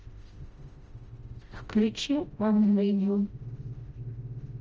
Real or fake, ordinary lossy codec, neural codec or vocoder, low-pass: fake; Opus, 24 kbps; codec, 16 kHz, 0.5 kbps, FreqCodec, smaller model; 7.2 kHz